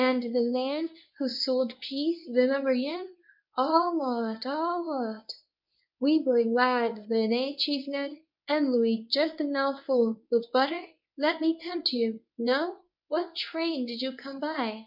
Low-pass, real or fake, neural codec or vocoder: 5.4 kHz; fake; codec, 24 kHz, 0.9 kbps, WavTokenizer, medium speech release version 1